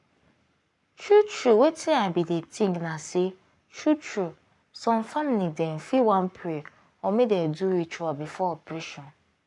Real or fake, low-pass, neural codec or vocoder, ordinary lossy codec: fake; 10.8 kHz; codec, 44.1 kHz, 7.8 kbps, Pupu-Codec; none